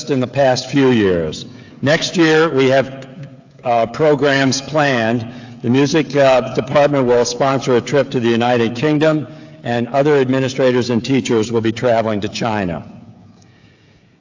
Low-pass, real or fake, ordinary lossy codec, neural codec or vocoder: 7.2 kHz; fake; AAC, 48 kbps; codec, 16 kHz, 16 kbps, FreqCodec, smaller model